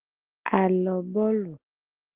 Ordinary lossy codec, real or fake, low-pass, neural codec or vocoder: Opus, 16 kbps; real; 3.6 kHz; none